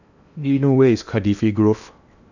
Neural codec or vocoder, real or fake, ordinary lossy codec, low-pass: codec, 16 kHz in and 24 kHz out, 0.8 kbps, FocalCodec, streaming, 65536 codes; fake; none; 7.2 kHz